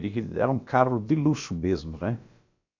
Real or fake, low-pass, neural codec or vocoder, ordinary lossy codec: fake; 7.2 kHz; codec, 16 kHz, about 1 kbps, DyCAST, with the encoder's durations; MP3, 64 kbps